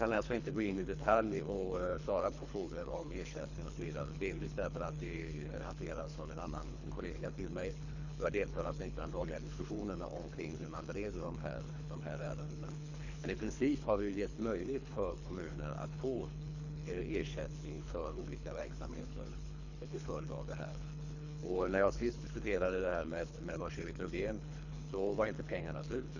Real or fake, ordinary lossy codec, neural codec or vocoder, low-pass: fake; none; codec, 24 kHz, 3 kbps, HILCodec; 7.2 kHz